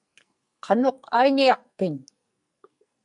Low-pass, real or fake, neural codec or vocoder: 10.8 kHz; fake; codec, 44.1 kHz, 2.6 kbps, SNAC